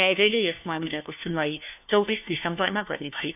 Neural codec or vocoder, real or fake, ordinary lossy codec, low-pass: codec, 16 kHz, 1 kbps, FunCodec, trained on LibriTTS, 50 frames a second; fake; none; 3.6 kHz